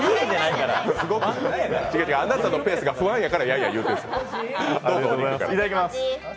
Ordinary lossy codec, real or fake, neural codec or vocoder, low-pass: none; real; none; none